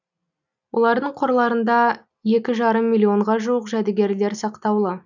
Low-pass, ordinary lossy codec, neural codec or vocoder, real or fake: 7.2 kHz; none; none; real